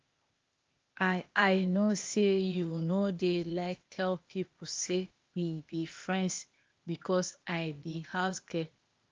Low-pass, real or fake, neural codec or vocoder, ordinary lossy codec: 7.2 kHz; fake; codec, 16 kHz, 0.8 kbps, ZipCodec; Opus, 24 kbps